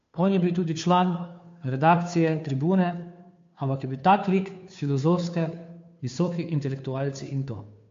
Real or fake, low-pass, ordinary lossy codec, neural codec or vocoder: fake; 7.2 kHz; MP3, 48 kbps; codec, 16 kHz, 2 kbps, FunCodec, trained on Chinese and English, 25 frames a second